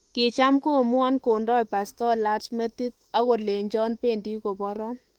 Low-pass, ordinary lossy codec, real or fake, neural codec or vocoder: 19.8 kHz; Opus, 24 kbps; fake; autoencoder, 48 kHz, 32 numbers a frame, DAC-VAE, trained on Japanese speech